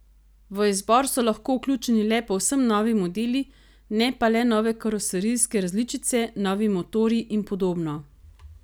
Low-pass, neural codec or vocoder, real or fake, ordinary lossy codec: none; none; real; none